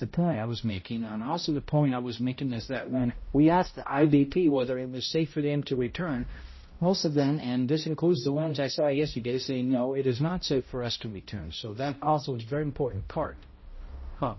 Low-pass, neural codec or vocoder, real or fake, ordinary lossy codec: 7.2 kHz; codec, 16 kHz, 0.5 kbps, X-Codec, HuBERT features, trained on balanced general audio; fake; MP3, 24 kbps